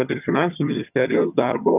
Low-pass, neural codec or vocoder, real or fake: 3.6 kHz; vocoder, 22.05 kHz, 80 mel bands, HiFi-GAN; fake